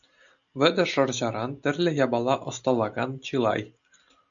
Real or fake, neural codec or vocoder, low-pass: real; none; 7.2 kHz